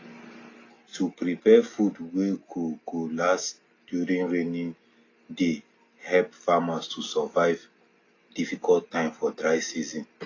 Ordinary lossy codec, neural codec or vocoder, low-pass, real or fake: AAC, 32 kbps; none; 7.2 kHz; real